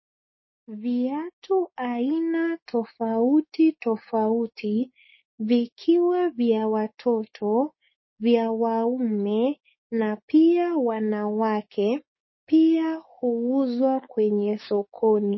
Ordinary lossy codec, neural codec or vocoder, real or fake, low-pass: MP3, 24 kbps; codec, 16 kHz, 6 kbps, DAC; fake; 7.2 kHz